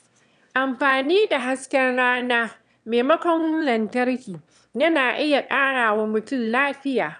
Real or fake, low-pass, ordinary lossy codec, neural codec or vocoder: fake; 9.9 kHz; none; autoencoder, 22.05 kHz, a latent of 192 numbers a frame, VITS, trained on one speaker